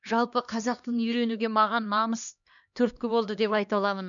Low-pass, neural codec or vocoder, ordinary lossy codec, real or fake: 7.2 kHz; codec, 16 kHz, 2 kbps, X-Codec, HuBERT features, trained on LibriSpeech; none; fake